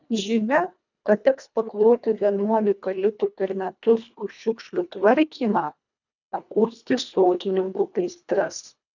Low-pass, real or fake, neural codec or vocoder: 7.2 kHz; fake; codec, 24 kHz, 1.5 kbps, HILCodec